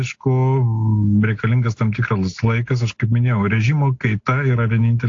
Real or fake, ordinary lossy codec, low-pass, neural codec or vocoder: real; AAC, 48 kbps; 7.2 kHz; none